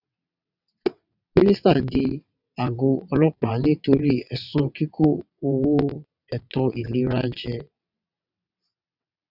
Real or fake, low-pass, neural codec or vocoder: fake; 5.4 kHz; vocoder, 22.05 kHz, 80 mel bands, WaveNeXt